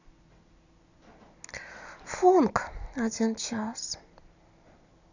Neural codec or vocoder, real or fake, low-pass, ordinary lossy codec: none; real; 7.2 kHz; none